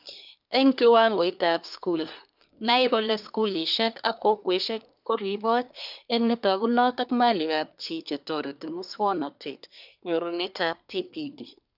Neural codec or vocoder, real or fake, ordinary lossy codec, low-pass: codec, 24 kHz, 1 kbps, SNAC; fake; none; 5.4 kHz